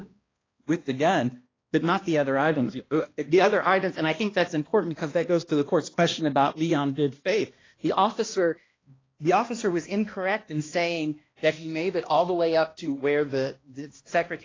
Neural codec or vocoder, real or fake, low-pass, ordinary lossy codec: codec, 16 kHz, 1 kbps, X-Codec, HuBERT features, trained on balanced general audio; fake; 7.2 kHz; AAC, 32 kbps